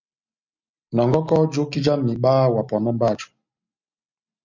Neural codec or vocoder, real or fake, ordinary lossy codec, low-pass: none; real; MP3, 64 kbps; 7.2 kHz